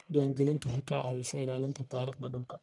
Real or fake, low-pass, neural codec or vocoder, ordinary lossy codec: fake; 10.8 kHz; codec, 44.1 kHz, 1.7 kbps, Pupu-Codec; none